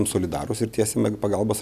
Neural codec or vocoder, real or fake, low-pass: none; real; 14.4 kHz